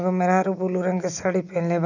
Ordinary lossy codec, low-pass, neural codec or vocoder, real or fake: none; 7.2 kHz; none; real